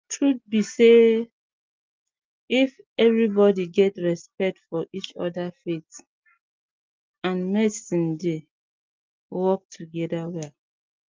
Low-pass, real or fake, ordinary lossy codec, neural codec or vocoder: 7.2 kHz; real; Opus, 32 kbps; none